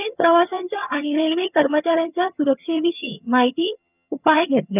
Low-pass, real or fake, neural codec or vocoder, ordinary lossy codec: 3.6 kHz; fake; vocoder, 22.05 kHz, 80 mel bands, HiFi-GAN; none